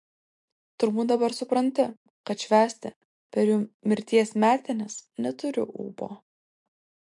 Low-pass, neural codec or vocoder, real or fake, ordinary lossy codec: 10.8 kHz; none; real; MP3, 64 kbps